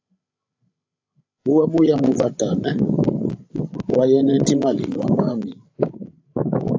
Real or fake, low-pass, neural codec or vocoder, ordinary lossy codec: fake; 7.2 kHz; codec, 16 kHz, 16 kbps, FreqCodec, larger model; AAC, 48 kbps